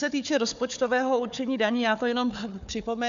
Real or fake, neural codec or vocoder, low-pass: fake; codec, 16 kHz, 4 kbps, FunCodec, trained on Chinese and English, 50 frames a second; 7.2 kHz